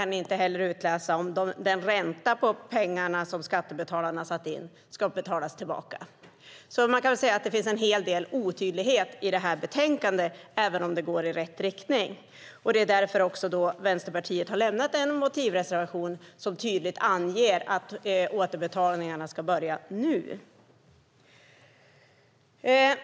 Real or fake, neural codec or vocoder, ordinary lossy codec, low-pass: real; none; none; none